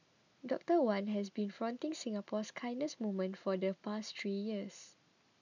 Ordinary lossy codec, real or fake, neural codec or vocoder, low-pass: none; real; none; 7.2 kHz